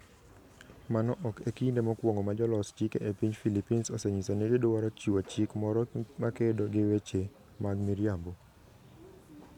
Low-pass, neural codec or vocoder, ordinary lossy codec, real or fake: 19.8 kHz; none; none; real